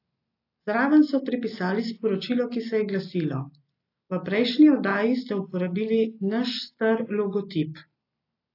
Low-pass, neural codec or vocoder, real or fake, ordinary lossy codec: 5.4 kHz; none; real; AAC, 32 kbps